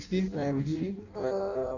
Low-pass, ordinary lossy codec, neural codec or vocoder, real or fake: 7.2 kHz; none; codec, 16 kHz in and 24 kHz out, 0.6 kbps, FireRedTTS-2 codec; fake